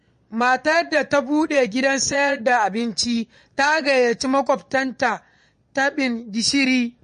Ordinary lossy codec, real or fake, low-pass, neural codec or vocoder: MP3, 48 kbps; fake; 9.9 kHz; vocoder, 22.05 kHz, 80 mel bands, Vocos